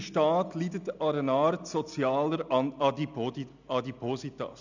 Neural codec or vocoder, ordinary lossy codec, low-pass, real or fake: none; none; 7.2 kHz; real